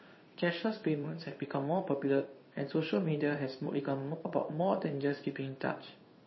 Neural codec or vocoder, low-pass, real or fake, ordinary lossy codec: codec, 16 kHz in and 24 kHz out, 1 kbps, XY-Tokenizer; 7.2 kHz; fake; MP3, 24 kbps